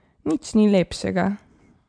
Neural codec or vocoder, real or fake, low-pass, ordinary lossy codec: none; real; 9.9 kHz; MP3, 64 kbps